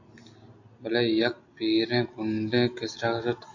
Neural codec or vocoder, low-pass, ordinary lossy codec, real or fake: none; 7.2 kHz; AAC, 48 kbps; real